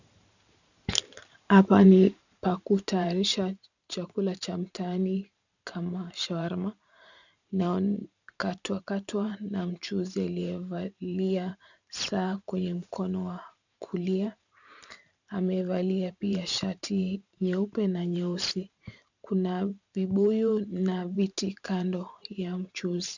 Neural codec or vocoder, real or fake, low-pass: vocoder, 44.1 kHz, 128 mel bands every 512 samples, BigVGAN v2; fake; 7.2 kHz